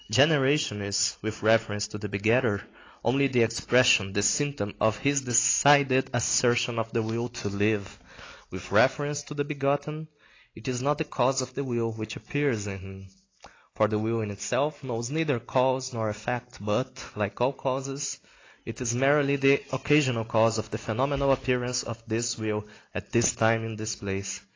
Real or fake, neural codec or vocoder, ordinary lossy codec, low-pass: real; none; AAC, 32 kbps; 7.2 kHz